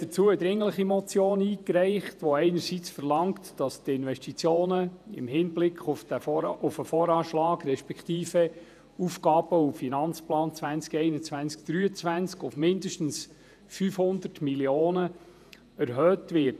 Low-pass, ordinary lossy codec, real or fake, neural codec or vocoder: 14.4 kHz; none; fake; vocoder, 48 kHz, 128 mel bands, Vocos